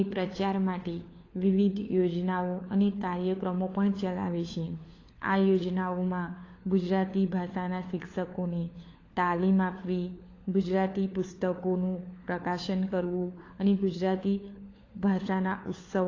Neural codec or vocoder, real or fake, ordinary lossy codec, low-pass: codec, 16 kHz, 4 kbps, FunCodec, trained on LibriTTS, 50 frames a second; fake; AAC, 32 kbps; 7.2 kHz